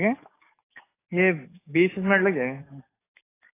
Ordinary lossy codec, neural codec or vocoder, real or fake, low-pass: AAC, 16 kbps; codec, 44.1 kHz, 7.8 kbps, DAC; fake; 3.6 kHz